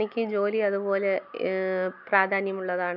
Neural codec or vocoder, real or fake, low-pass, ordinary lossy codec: none; real; 5.4 kHz; none